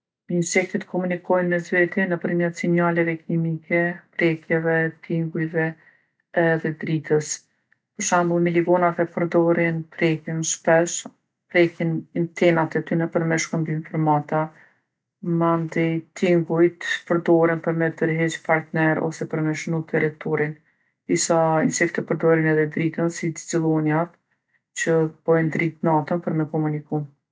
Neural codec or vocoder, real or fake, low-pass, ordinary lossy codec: none; real; none; none